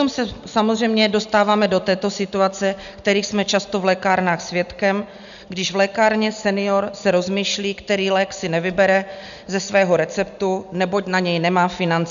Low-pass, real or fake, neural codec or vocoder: 7.2 kHz; real; none